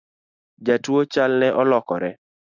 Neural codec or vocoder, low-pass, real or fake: none; 7.2 kHz; real